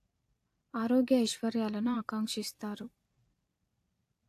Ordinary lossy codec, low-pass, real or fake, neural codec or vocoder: AAC, 64 kbps; 14.4 kHz; fake; vocoder, 44.1 kHz, 128 mel bands every 256 samples, BigVGAN v2